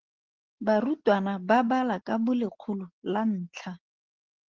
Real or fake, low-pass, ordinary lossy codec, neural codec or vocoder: real; 7.2 kHz; Opus, 16 kbps; none